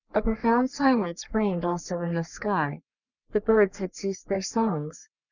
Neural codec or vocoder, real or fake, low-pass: codec, 44.1 kHz, 3.4 kbps, Pupu-Codec; fake; 7.2 kHz